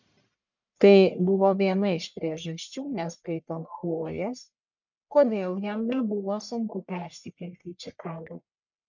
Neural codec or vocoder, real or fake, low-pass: codec, 44.1 kHz, 1.7 kbps, Pupu-Codec; fake; 7.2 kHz